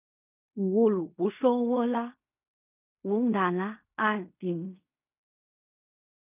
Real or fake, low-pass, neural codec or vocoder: fake; 3.6 kHz; codec, 16 kHz in and 24 kHz out, 0.4 kbps, LongCat-Audio-Codec, fine tuned four codebook decoder